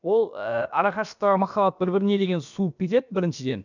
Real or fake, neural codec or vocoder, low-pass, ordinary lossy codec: fake; codec, 16 kHz, about 1 kbps, DyCAST, with the encoder's durations; 7.2 kHz; none